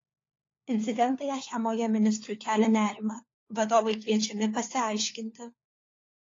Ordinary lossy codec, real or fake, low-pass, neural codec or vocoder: AAC, 32 kbps; fake; 7.2 kHz; codec, 16 kHz, 4 kbps, FunCodec, trained on LibriTTS, 50 frames a second